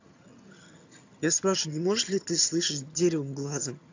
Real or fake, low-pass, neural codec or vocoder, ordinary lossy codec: fake; 7.2 kHz; vocoder, 22.05 kHz, 80 mel bands, HiFi-GAN; AAC, 48 kbps